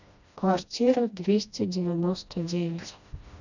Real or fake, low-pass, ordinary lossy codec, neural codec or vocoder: fake; 7.2 kHz; none; codec, 16 kHz, 1 kbps, FreqCodec, smaller model